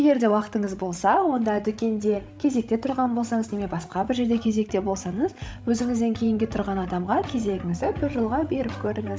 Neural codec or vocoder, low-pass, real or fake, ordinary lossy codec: codec, 16 kHz, 16 kbps, FreqCodec, larger model; none; fake; none